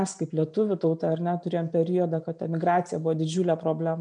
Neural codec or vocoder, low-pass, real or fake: none; 9.9 kHz; real